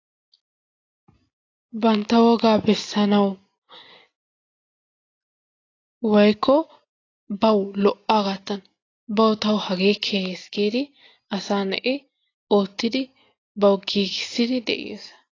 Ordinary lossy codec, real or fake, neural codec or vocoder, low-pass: AAC, 32 kbps; real; none; 7.2 kHz